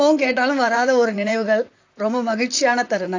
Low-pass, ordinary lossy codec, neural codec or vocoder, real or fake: 7.2 kHz; AAC, 48 kbps; vocoder, 44.1 kHz, 128 mel bands, Pupu-Vocoder; fake